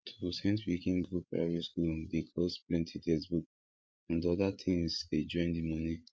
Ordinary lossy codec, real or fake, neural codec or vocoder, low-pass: none; fake; codec, 16 kHz, 8 kbps, FreqCodec, larger model; none